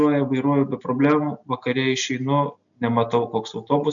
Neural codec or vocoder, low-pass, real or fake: none; 7.2 kHz; real